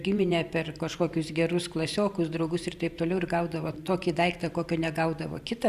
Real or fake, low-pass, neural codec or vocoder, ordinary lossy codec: fake; 14.4 kHz; vocoder, 44.1 kHz, 128 mel bands every 512 samples, BigVGAN v2; Opus, 64 kbps